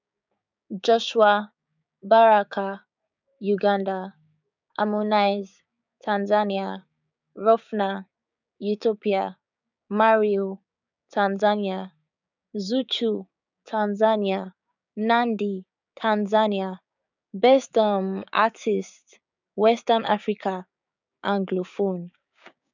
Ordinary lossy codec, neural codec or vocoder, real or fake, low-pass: none; codec, 16 kHz, 6 kbps, DAC; fake; 7.2 kHz